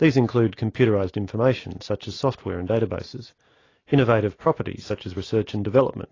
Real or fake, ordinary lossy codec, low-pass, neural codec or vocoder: real; AAC, 32 kbps; 7.2 kHz; none